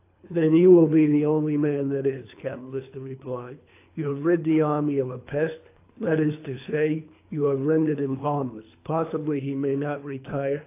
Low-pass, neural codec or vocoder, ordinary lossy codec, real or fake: 3.6 kHz; codec, 24 kHz, 3 kbps, HILCodec; AAC, 24 kbps; fake